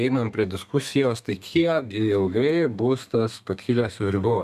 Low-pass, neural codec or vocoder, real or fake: 14.4 kHz; codec, 32 kHz, 1.9 kbps, SNAC; fake